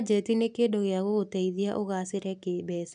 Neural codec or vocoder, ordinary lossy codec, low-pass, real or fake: none; none; 9.9 kHz; real